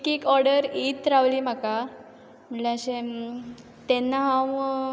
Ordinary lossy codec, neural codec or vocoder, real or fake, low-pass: none; none; real; none